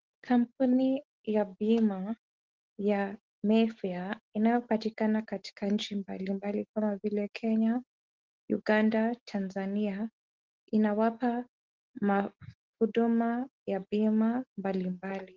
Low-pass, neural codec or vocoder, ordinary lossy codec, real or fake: 7.2 kHz; none; Opus, 16 kbps; real